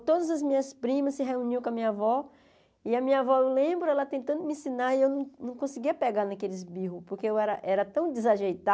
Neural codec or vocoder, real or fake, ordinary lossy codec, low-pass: none; real; none; none